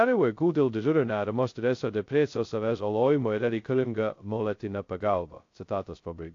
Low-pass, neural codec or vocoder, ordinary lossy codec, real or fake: 7.2 kHz; codec, 16 kHz, 0.2 kbps, FocalCodec; AAC, 48 kbps; fake